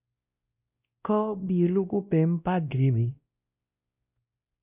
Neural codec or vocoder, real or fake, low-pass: codec, 16 kHz, 1 kbps, X-Codec, WavLM features, trained on Multilingual LibriSpeech; fake; 3.6 kHz